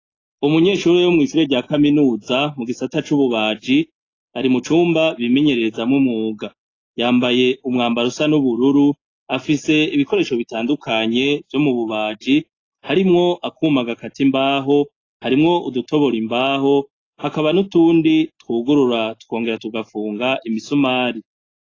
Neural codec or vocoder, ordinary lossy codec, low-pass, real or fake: none; AAC, 32 kbps; 7.2 kHz; real